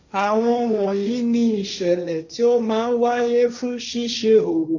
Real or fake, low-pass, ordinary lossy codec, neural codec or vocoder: fake; 7.2 kHz; none; codec, 16 kHz, 1.1 kbps, Voila-Tokenizer